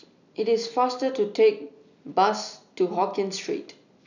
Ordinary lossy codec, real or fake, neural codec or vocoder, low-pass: none; real; none; 7.2 kHz